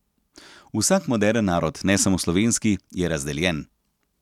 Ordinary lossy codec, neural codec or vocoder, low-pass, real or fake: none; none; 19.8 kHz; real